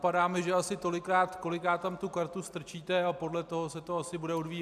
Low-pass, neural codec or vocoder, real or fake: 14.4 kHz; none; real